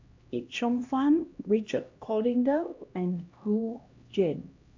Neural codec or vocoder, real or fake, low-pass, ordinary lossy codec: codec, 16 kHz, 1 kbps, X-Codec, HuBERT features, trained on LibriSpeech; fake; 7.2 kHz; MP3, 48 kbps